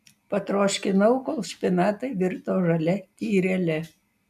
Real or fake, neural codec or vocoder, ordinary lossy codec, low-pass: real; none; MP3, 96 kbps; 14.4 kHz